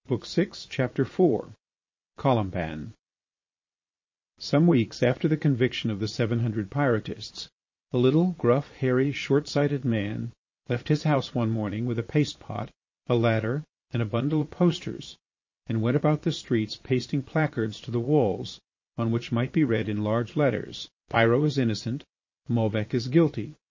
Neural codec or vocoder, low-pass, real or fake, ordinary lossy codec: vocoder, 22.05 kHz, 80 mel bands, Vocos; 7.2 kHz; fake; MP3, 32 kbps